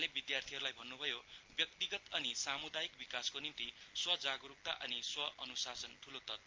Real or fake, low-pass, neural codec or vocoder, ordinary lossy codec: real; 7.2 kHz; none; Opus, 32 kbps